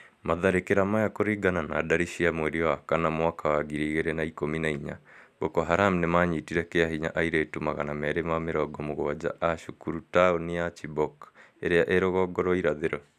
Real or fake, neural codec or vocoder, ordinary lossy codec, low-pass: real; none; none; 14.4 kHz